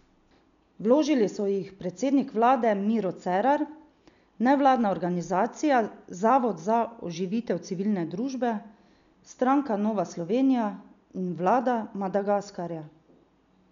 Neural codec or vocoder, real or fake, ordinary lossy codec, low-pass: none; real; none; 7.2 kHz